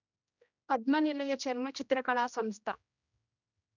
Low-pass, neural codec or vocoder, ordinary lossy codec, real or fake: 7.2 kHz; codec, 16 kHz, 1 kbps, X-Codec, HuBERT features, trained on general audio; none; fake